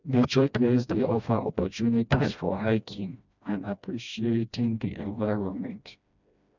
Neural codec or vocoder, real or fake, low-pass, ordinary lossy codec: codec, 16 kHz, 1 kbps, FreqCodec, smaller model; fake; 7.2 kHz; none